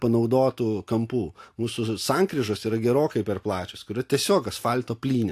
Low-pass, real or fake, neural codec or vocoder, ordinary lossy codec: 14.4 kHz; real; none; AAC, 64 kbps